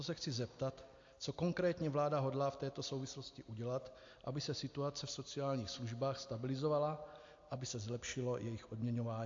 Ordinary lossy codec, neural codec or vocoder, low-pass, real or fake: AAC, 48 kbps; none; 7.2 kHz; real